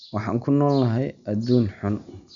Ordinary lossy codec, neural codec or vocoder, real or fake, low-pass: none; none; real; 7.2 kHz